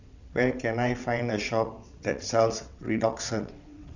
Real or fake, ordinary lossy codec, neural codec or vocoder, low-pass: fake; none; vocoder, 22.05 kHz, 80 mel bands, WaveNeXt; 7.2 kHz